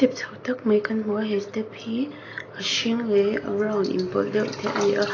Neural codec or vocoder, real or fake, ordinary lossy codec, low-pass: none; real; AAC, 32 kbps; 7.2 kHz